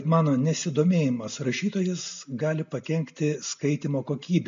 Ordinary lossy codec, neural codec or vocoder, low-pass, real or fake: MP3, 48 kbps; none; 7.2 kHz; real